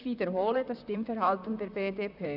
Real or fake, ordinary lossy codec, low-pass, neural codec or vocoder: fake; none; 5.4 kHz; vocoder, 44.1 kHz, 128 mel bands, Pupu-Vocoder